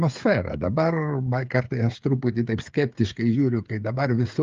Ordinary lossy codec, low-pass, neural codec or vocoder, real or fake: Opus, 32 kbps; 7.2 kHz; codec, 16 kHz, 8 kbps, FreqCodec, smaller model; fake